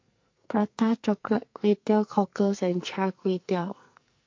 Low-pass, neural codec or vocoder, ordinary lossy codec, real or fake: 7.2 kHz; codec, 44.1 kHz, 2.6 kbps, SNAC; MP3, 48 kbps; fake